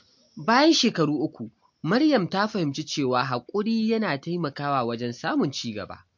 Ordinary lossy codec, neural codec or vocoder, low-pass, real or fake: MP3, 48 kbps; none; 7.2 kHz; real